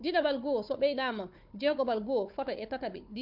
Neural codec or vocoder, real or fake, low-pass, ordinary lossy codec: codec, 16 kHz, 4 kbps, FunCodec, trained on Chinese and English, 50 frames a second; fake; 5.4 kHz; MP3, 48 kbps